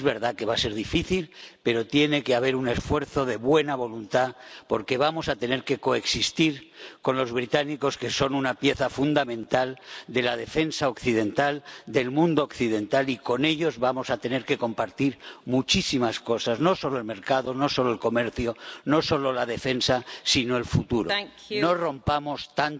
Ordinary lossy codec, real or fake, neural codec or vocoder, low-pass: none; real; none; none